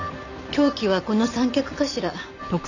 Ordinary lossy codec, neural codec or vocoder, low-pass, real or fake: none; none; 7.2 kHz; real